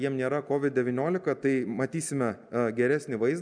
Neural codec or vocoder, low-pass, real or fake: none; 9.9 kHz; real